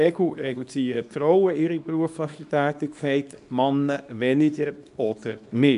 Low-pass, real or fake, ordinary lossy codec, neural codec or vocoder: 10.8 kHz; fake; none; codec, 24 kHz, 0.9 kbps, WavTokenizer, small release